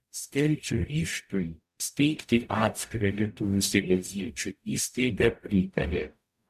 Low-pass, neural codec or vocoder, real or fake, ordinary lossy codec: 14.4 kHz; codec, 44.1 kHz, 0.9 kbps, DAC; fake; MP3, 96 kbps